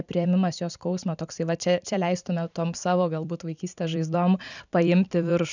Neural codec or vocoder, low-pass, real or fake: vocoder, 44.1 kHz, 128 mel bands every 256 samples, BigVGAN v2; 7.2 kHz; fake